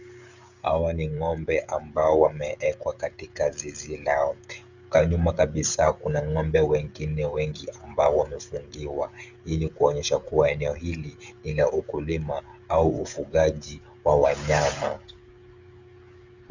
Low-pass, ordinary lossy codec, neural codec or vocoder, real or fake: 7.2 kHz; Opus, 64 kbps; codec, 16 kHz, 16 kbps, FreqCodec, smaller model; fake